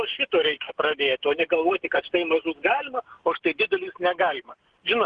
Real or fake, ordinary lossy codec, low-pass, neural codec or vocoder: real; Opus, 16 kbps; 9.9 kHz; none